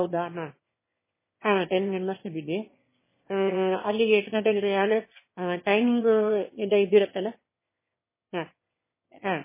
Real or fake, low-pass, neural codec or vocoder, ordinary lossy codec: fake; 3.6 kHz; autoencoder, 22.05 kHz, a latent of 192 numbers a frame, VITS, trained on one speaker; MP3, 16 kbps